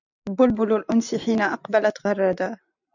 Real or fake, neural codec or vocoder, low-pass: real; none; 7.2 kHz